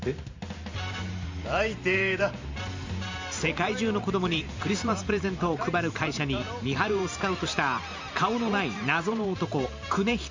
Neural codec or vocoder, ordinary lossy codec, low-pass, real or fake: vocoder, 44.1 kHz, 128 mel bands every 512 samples, BigVGAN v2; MP3, 64 kbps; 7.2 kHz; fake